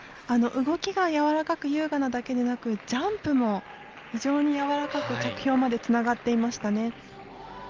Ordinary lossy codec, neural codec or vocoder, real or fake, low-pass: Opus, 24 kbps; none; real; 7.2 kHz